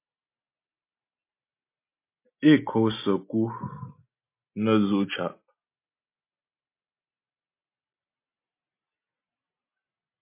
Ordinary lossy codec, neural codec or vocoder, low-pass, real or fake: MP3, 24 kbps; none; 3.6 kHz; real